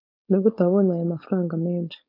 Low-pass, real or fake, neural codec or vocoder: 5.4 kHz; fake; codec, 16 kHz, 4.8 kbps, FACodec